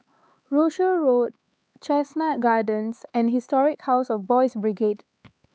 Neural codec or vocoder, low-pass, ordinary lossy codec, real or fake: codec, 16 kHz, 4 kbps, X-Codec, HuBERT features, trained on LibriSpeech; none; none; fake